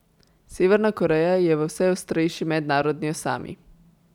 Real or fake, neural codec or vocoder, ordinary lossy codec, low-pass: real; none; none; 19.8 kHz